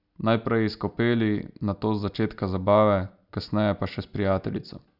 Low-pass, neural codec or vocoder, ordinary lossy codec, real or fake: 5.4 kHz; none; none; real